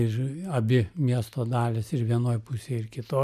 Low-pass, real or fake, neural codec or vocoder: 14.4 kHz; real; none